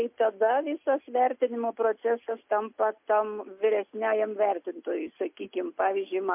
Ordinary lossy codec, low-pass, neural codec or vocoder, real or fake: MP3, 32 kbps; 3.6 kHz; none; real